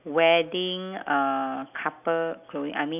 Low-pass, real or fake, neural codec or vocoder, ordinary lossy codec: 3.6 kHz; real; none; none